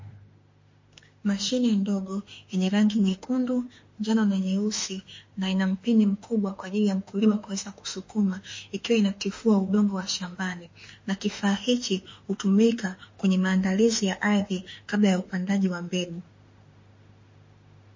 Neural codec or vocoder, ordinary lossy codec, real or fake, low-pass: autoencoder, 48 kHz, 32 numbers a frame, DAC-VAE, trained on Japanese speech; MP3, 32 kbps; fake; 7.2 kHz